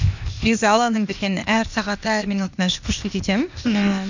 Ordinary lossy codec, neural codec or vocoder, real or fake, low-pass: none; codec, 16 kHz, 0.8 kbps, ZipCodec; fake; 7.2 kHz